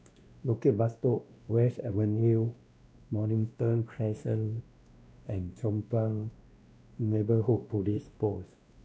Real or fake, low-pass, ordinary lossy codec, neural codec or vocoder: fake; none; none; codec, 16 kHz, 1 kbps, X-Codec, WavLM features, trained on Multilingual LibriSpeech